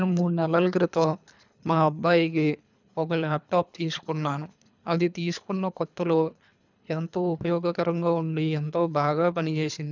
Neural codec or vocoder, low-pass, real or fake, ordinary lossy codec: codec, 24 kHz, 3 kbps, HILCodec; 7.2 kHz; fake; none